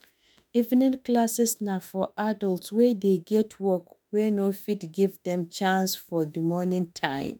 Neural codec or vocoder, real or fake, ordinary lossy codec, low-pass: autoencoder, 48 kHz, 32 numbers a frame, DAC-VAE, trained on Japanese speech; fake; none; none